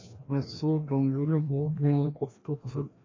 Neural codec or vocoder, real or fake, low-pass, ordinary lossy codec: codec, 16 kHz, 1 kbps, FreqCodec, larger model; fake; 7.2 kHz; AAC, 32 kbps